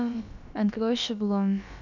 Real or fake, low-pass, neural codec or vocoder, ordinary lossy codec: fake; 7.2 kHz; codec, 16 kHz, about 1 kbps, DyCAST, with the encoder's durations; none